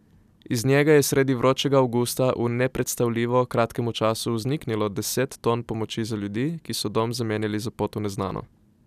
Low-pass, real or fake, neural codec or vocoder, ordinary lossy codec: 14.4 kHz; real; none; none